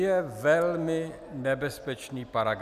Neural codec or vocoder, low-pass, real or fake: none; 14.4 kHz; real